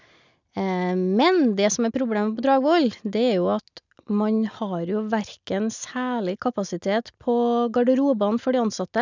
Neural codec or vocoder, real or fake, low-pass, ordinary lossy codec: none; real; 7.2 kHz; none